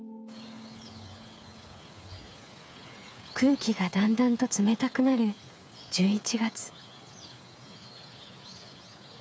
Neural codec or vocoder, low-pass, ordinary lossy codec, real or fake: codec, 16 kHz, 8 kbps, FreqCodec, smaller model; none; none; fake